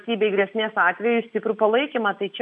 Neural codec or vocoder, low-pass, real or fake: none; 10.8 kHz; real